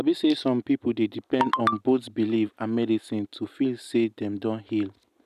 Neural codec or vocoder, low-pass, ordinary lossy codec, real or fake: none; 14.4 kHz; none; real